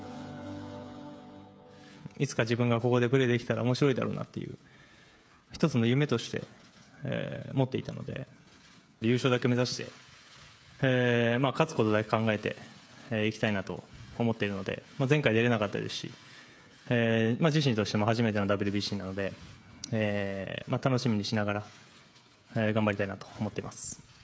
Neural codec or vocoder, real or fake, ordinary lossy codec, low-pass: codec, 16 kHz, 16 kbps, FreqCodec, smaller model; fake; none; none